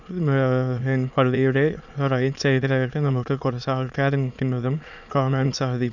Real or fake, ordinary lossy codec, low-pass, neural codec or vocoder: fake; none; 7.2 kHz; autoencoder, 22.05 kHz, a latent of 192 numbers a frame, VITS, trained on many speakers